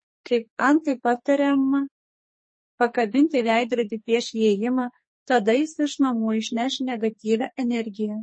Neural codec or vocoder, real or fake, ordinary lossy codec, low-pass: codec, 44.1 kHz, 2.6 kbps, SNAC; fake; MP3, 32 kbps; 9.9 kHz